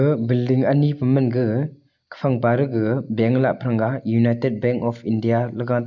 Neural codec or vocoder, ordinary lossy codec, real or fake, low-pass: none; none; real; 7.2 kHz